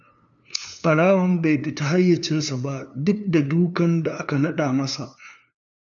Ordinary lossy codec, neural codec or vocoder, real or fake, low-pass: none; codec, 16 kHz, 2 kbps, FunCodec, trained on LibriTTS, 25 frames a second; fake; 7.2 kHz